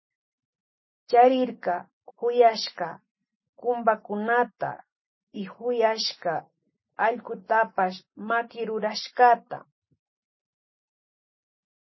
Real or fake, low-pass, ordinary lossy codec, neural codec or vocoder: real; 7.2 kHz; MP3, 24 kbps; none